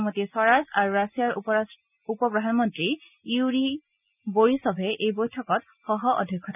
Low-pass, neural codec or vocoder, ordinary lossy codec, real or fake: 3.6 kHz; none; none; real